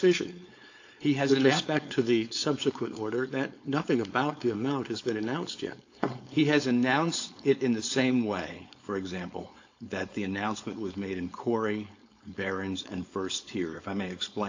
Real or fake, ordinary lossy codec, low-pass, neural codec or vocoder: fake; AAC, 48 kbps; 7.2 kHz; codec, 16 kHz, 4.8 kbps, FACodec